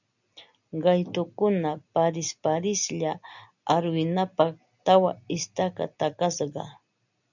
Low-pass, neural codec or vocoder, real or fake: 7.2 kHz; none; real